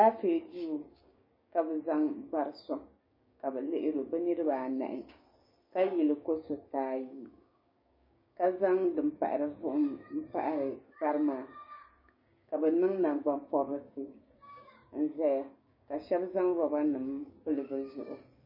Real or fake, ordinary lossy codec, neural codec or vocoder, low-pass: fake; MP3, 24 kbps; codec, 16 kHz, 6 kbps, DAC; 5.4 kHz